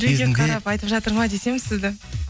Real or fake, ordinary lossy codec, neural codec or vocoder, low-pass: real; none; none; none